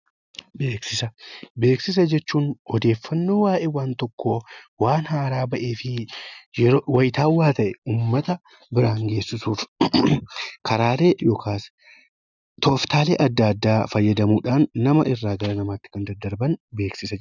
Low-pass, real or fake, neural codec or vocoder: 7.2 kHz; real; none